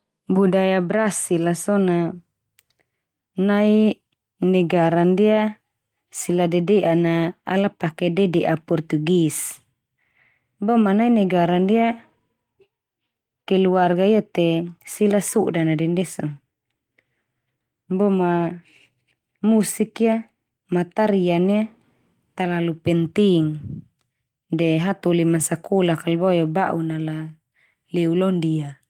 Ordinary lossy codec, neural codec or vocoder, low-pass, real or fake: Opus, 24 kbps; none; 19.8 kHz; real